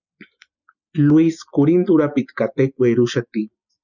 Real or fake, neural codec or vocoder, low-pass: real; none; 7.2 kHz